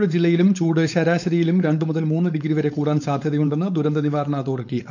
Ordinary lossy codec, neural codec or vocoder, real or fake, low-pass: none; codec, 16 kHz, 4.8 kbps, FACodec; fake; 7.2 kHz